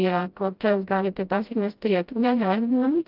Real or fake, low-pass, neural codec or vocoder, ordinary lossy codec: fake; 5.4 kHz; codec, 16 kHz, 0.5 kbps, FreqCodec, smaller model; Opus, 32 kbps